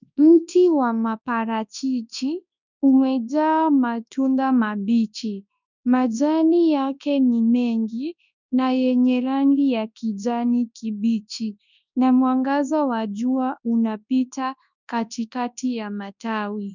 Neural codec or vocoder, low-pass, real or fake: codec, 24 kHz, 0.9 kbps, WavTokenizer, large speech release; 7.2 kHz; fake